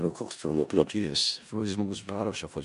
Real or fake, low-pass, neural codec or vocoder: fake; 10.8 kHz; codec, 16 kHz in and 24 kHz out, 0.4 kbps, LongCat-Audio-Codec, four codebook decoder